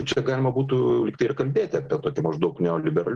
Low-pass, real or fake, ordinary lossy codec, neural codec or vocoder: 10.8 kHz; real; Opus, 16 kbps; none